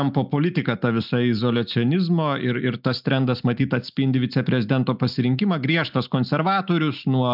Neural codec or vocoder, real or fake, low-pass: none; real; 5.4 kHz